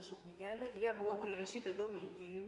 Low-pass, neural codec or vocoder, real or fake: 10.8 kHz; codec, 24 kHz, 1 kbps, SNAC; fake